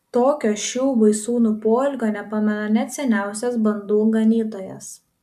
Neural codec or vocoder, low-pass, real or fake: none; 14.4 kHz; real